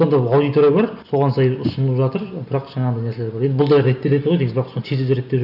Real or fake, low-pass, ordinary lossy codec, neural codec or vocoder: real; 5.4 kHz; none; none